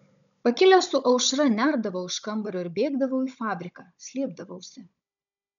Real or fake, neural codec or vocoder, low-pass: fake; codec, 16 kHz, 16 kbps, FunCodec, trained on Chinese and English, 50 frames a second; 7.2 kHz